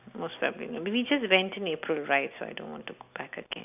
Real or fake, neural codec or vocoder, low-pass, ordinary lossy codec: real; none; 3.6 kHz; none